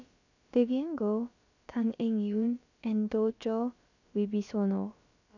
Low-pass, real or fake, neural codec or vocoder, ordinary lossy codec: 7.2 kHz; fake; codec, 16 kHz, about 1 kbps, DyCAST, with the encoder's durations; none